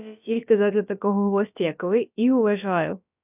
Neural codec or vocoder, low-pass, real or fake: codec, 16 kHz, about 1 kbps, DyCAST, with the encoder's durations; 3.6 kHz; fake